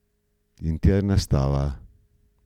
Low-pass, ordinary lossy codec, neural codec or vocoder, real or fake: 19.8 kHz; none; none; real